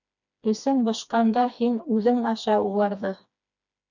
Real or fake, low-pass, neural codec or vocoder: fake; 7.2 kHz; codec, 16 kHz, 2 kbps, FreqCodec, smaller model